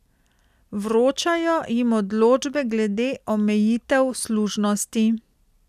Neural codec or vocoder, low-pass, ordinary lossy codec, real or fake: none; 14.4 kHz; none; real